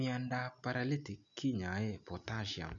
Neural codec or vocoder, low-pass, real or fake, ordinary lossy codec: none; 7.2 kHz; real; none